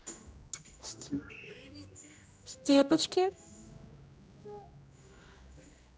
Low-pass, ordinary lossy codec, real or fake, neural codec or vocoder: none; none; fake; codec, 16 kHz, 1 kbps, X-Codec, HuBERT features, trained on general audio